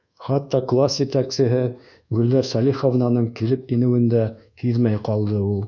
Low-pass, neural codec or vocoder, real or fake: 7.2 kHz; codec, 24 kHz, 1.2 kbps, DualCodec; fake